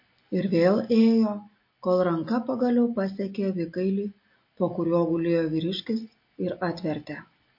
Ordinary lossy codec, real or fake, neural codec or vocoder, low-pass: MP3, 32 kbps; real; none; 5.4 kHz